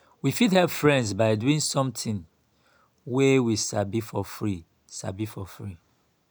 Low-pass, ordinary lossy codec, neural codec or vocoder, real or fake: none; none; none; real